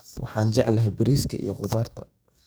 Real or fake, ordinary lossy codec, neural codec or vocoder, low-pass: fake; none; codec, 44.1 kHz, 2.6 kbps, DAC; none